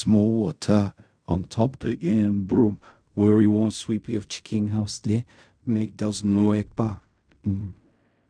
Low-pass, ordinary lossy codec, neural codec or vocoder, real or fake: 9.9 kHz; MP3, 96 kbps; codec, 16 kHz in and 24 kHz out, 0.4 kbps, LongCat-Audio-Codec, fine tuned four codebook decoder; fake